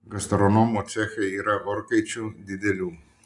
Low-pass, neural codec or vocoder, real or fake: 10.8 kHz; none; real